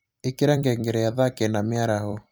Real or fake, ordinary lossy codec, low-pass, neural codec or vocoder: fake; none; none; vocoder, 44.1 kHz, 128 mel bands every 256 samples, BigVGAN v2